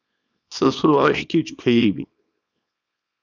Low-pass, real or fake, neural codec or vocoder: 7.2 kHz; fake; codec, 24 kHz, 0.9 kbps, WavTokenizer, small release